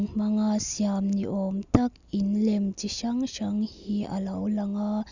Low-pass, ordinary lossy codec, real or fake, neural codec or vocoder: 7.2 kHz; none; real; none